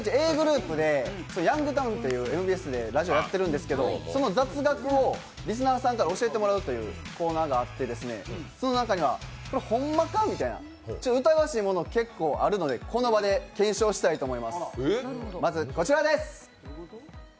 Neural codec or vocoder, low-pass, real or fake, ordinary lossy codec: none; none; real; none